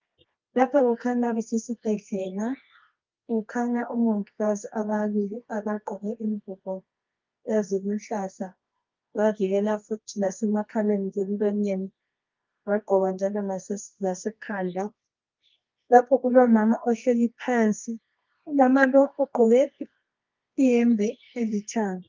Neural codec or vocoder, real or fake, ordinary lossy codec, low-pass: codec, 24 kHz, 0.9 kbps, WavTokenizer, medium music audio release; fake; Opus, 32 kbps; 7.2 kHz